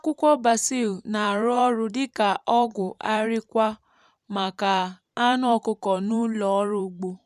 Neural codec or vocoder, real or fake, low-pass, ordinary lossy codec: vocoder, 44.1 kHz, 128 mel bands every 512 samples, BigVGAN v2; fake; 14.4 kHz; none